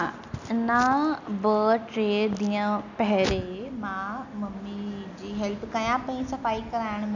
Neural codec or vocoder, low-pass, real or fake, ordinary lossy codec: none; 7.2 kHz; real; none